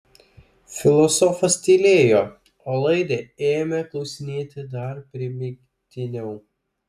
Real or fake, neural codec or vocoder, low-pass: real; none; 14.4 kHz